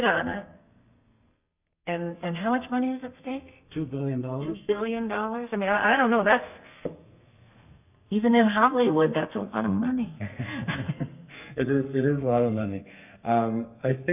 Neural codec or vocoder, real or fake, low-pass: codec, 32 kHz, 1.9 kbps, SNAC; fake; 3.6 kHz